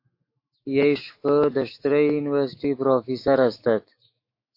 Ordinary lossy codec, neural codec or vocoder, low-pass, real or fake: MP3, 32 kbps; autoencoder, 48 kHz, 128 numbers a frame, DAC-VAE, trained on Japanese speech; 5.4 kHz; fake